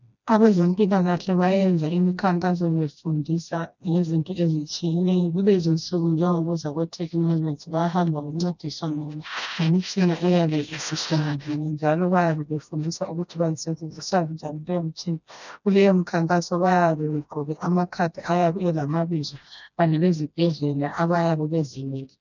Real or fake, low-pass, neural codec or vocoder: fake; 7.2 kHz; codec, 16 kHz, 1 kbps, FreqCodec, smaller model